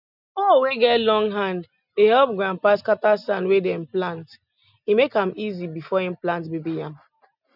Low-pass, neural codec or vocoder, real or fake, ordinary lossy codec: 5.4 kHz; none; real; none